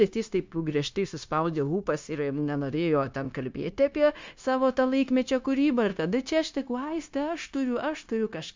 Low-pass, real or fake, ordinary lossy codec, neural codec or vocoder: 7.2 kHz; fake; MP3, 64 kbps; codec, 16 kHz, 0.9 kbps, LongCat-Audio-Codec